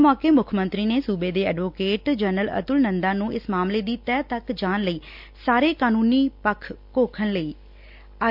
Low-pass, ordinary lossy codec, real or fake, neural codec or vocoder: 5.4 kHz; MP3, 48 kbps; real; none